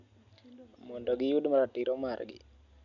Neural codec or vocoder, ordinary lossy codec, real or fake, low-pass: none; none; real; 7.2 kHz